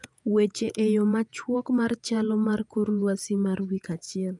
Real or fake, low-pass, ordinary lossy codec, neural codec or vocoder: fake; 10.8 kHz; none; vocoder, 48 kHz, 128 mel bands, Vocos